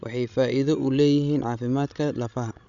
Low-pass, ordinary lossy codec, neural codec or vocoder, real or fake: 7.2 kHz; none; codec, 16 kHz, 16 kbps, FreqCodec, larger model; fake